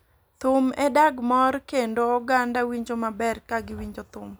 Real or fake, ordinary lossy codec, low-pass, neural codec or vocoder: real; none; none; none